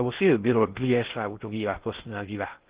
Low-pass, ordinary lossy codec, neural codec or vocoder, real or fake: 3.6 kHz; Opus, 16 kbps; codec, 16 kHz in and 24 kHz out, 0.6 kbps, FocalCodec, streaming, 2048 codes; fake